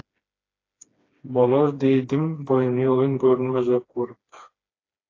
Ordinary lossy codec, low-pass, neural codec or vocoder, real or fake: AAC, 32 kbps; 7.2 kHz; codec, 16 kHz, 2 kbps, FreqCodec, smaller model; fake